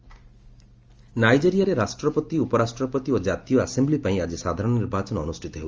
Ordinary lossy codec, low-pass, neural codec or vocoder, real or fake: Opus, 24 kbps; 7.2 kHz; none; real